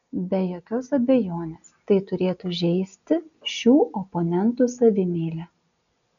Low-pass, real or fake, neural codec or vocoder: 7.2 kHz; real; none